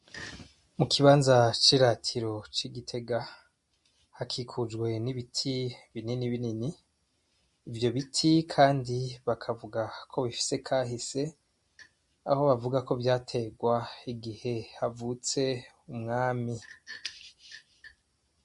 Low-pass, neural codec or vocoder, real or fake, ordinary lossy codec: 14.4 kHz; none; real; MP3, 48 kbps